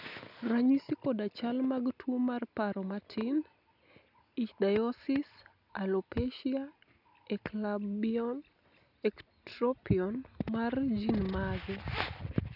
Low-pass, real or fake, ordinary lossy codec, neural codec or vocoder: 5.4 kHz; real; none; none